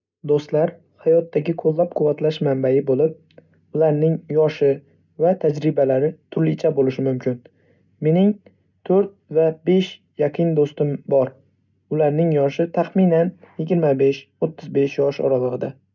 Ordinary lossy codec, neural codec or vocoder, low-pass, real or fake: none; none; 7.2 kHz; real